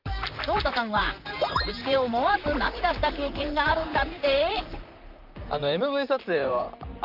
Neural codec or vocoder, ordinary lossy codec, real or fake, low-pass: vocoder, 44.1 kHz, 128 mel bands, Pupu-Vocoder; Opus, 32 kbps; fake; 5.4 kHz